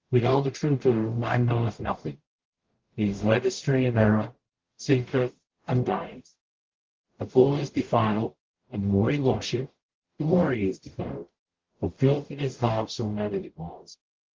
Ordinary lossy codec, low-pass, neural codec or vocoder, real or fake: Opus, 16 kbps; 7.2 kHz; codec, 44.1 kHz, 0.9 kbps, DAC; fake